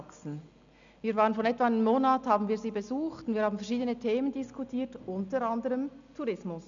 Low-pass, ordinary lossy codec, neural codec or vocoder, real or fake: 7.2 kHz; none; none; real